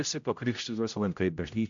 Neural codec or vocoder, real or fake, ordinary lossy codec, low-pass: codec, 16 kHz, 0.5 kbps, X-Codec, HuBERT features, trained on general audio; fake; MP3, 64 kbps; 7.2 kHz